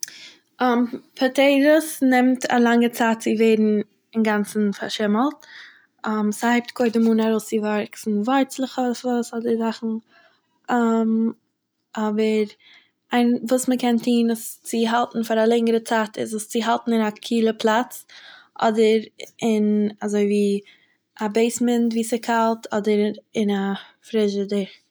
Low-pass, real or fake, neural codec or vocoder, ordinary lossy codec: none; real; none; none